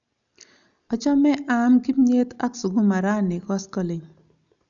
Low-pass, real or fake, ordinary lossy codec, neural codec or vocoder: 7.2 kHz; real; none; none